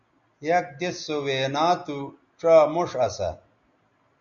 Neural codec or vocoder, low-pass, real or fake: none; 7.2 kHz; real